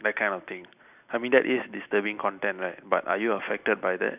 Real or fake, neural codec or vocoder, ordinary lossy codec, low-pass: real; none; none; 3.6 kHz